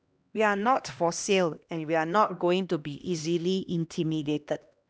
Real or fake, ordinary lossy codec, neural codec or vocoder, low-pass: fake; none; codec, 16 kHz, 1 kbps, X-Codec, HuBERT features, trained on LibriSpeech; none